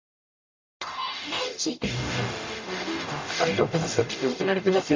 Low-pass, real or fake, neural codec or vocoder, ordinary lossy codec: 7.2 kHz; fake; codec, 44.1 kHz, 0.9 kbps, DAC; MP3, 64 kbps